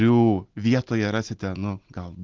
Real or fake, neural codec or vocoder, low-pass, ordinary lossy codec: real; none; 7.2 kHz; Opus, 16 kbps